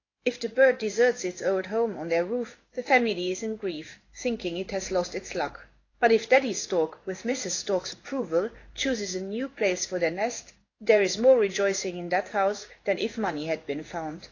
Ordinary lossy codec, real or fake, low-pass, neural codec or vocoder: AAC, 32 kbps; fake; 7.2 kHz; codec, 16 kHz in and 24 kHz out, 1 kbps, XY-Tokenizer